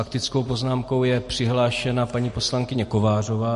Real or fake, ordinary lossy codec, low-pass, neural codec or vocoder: real; MP3, 48 kbps; 14.4 kHz; none